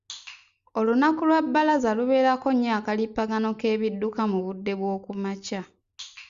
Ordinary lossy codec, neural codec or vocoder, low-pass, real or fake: Opus, 64 kbps; none; 7.2 kHz; real